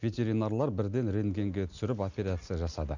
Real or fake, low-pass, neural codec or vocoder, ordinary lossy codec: real; 7.2 kHz; none; none